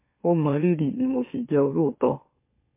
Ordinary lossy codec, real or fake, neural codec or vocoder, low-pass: MP3, 24 kbps; fake; autoencoder, 44.1 kHz, a latent of 192 numbers a frame, MeloTTS; 3.6 kHz